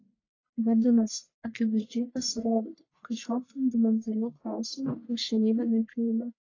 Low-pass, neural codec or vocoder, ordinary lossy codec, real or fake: 7.2 kHz; codec, 44.1 kHz, 1.7 kbps, Pupu-Codec; AAC, 32 kbps; fake